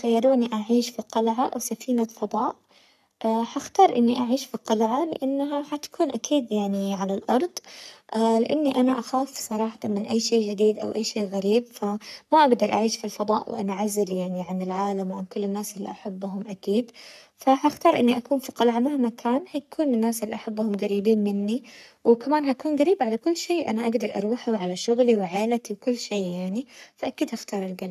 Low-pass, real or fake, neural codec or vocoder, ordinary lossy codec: 14.4 kHz; fake; codec, 44.1 kHz, 3.4 kbps, Pupu-Codec; none